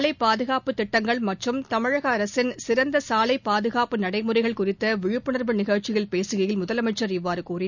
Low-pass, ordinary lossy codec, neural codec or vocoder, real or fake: 7.2 kHz; none; none; real